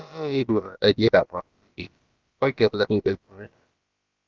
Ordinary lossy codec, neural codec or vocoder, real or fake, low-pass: Opus, 32 kbps; codec, 16 kHz, about 1 kbps, DyCAST, with the encoder's durations; fake; 7.2 kHz